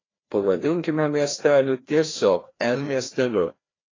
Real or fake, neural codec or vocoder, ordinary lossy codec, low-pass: fake; codec, 16 kHz, 1 kbps, FreqCodec, larger model; AAC, 32 kbps; 7.2 kHz